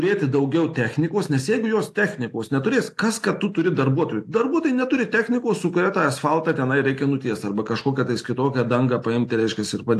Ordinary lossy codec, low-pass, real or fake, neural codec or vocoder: AAC, 64 kbps; 14.4 kHz; real; none